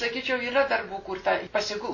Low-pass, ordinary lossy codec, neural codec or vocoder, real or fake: 7.2 kHz; MP3, 32 kbps; none; real